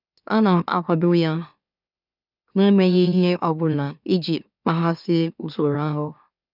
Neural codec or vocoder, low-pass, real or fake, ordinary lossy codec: autoencoder, 44.1 kHz, a latent of 192 numbers a frame, MeloTTS; 5.4 kHz; fake; none